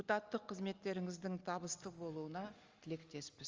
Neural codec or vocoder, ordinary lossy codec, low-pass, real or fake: none; Opus, 32 kbps; 7.2 kHz; real